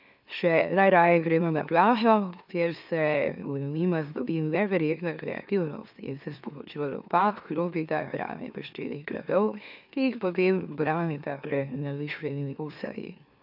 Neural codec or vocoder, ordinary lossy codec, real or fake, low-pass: autoencoder, 44.1 kHz, a latent of 192 numbers a frame, MeloTTS; none; fake; 5.4 kHz